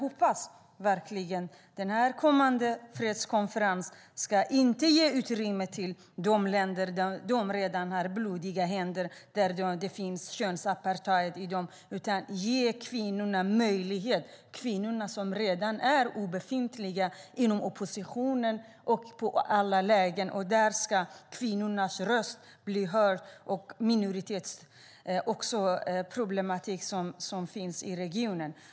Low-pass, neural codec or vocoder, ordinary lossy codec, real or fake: none; none; none; real